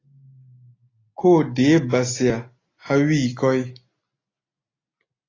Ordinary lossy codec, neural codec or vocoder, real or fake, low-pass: AAC, 32 kbps; none; real; 7.2 kHz